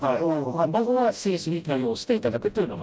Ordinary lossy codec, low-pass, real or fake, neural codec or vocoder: none; none; fake; codec, 16 kHz, 0.5 kbps, FreqCodec, smaller model